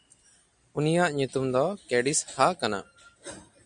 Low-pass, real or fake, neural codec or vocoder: 9.9 kHz; real; none